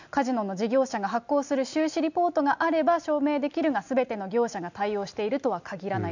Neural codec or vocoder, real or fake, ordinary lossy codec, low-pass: none; real; none; 7.2 kHz